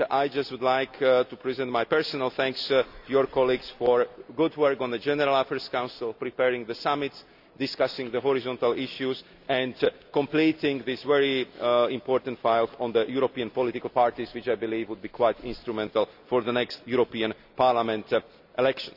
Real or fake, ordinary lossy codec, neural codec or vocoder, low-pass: real; none; none; 5.4 kHz